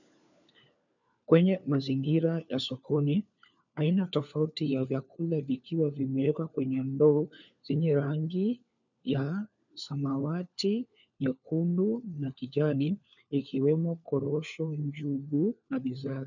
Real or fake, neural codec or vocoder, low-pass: fake; codec, 16 kHz, 4 kbps, FunCodec, trained on LibriTTS, 50 frames a second; 7.2 kHz